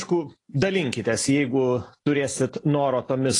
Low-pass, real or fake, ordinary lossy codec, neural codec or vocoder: 10.8 kHz; real; AAC, 32 kbps; none